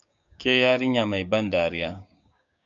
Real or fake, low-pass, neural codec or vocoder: fake; 7.2 kHz; codec, 16 kHz, 6 kbps, DAC